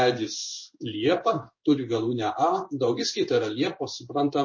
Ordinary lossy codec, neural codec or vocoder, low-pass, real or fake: MP3, 32 kbps; codec, 16 kHz in and 24 kHz out, 1 kbps, XY-Tokenizer; 7.2 kHz; fake